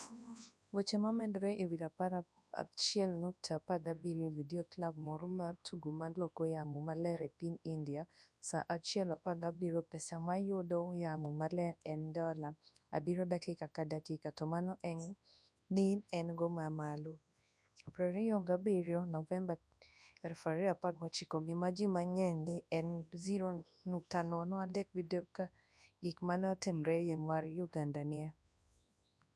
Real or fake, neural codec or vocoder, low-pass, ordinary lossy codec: fake; codec, 24 kHz, 0.9 kbps, WavTokenizer, large speech release; none; none